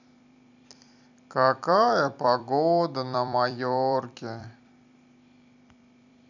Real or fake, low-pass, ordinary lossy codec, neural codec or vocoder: fake; 7.2 kHz; none; vocoder, 44.1 kHz, 128 mel bands every 256 samples, BigVGAN v2